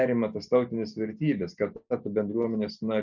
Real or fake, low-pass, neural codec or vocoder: real; 7.2 kHz; none